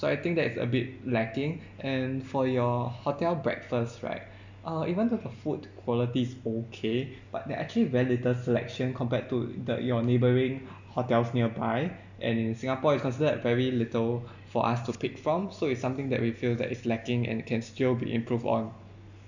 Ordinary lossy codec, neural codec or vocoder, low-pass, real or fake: none; none; 7.2 kHz; real